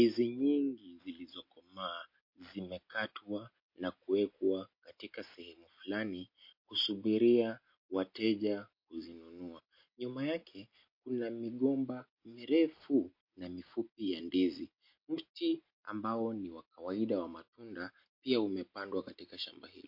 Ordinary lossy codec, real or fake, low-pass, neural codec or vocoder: MP3, 32 kbps; real; 5.4 kHz; none